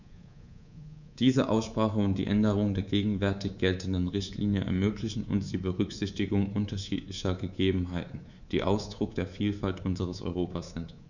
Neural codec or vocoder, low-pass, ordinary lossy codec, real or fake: codec, 24 kHz, 3.1 kbps, DualCodec; 7.2 kHz; none; fake